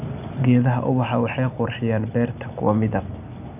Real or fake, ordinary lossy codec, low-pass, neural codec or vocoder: real; none; 3.6 kHz; none